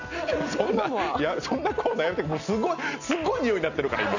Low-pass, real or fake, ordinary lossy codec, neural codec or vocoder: 7.2 kHz; real; none; none